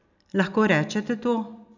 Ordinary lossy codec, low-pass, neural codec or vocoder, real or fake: none; 7.2 kHz; none; real